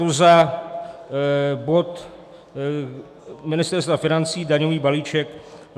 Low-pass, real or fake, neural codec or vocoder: 14.4 kHz; fake; autoencoder, 48 kHz, 128 numbers a frame, DAC-VAE, trained on Japanese speech